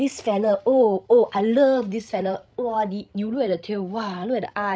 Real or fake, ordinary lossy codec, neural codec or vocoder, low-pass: fake; none; codec, 16 kHz, 16 kbps, FreqCodec, larger model; none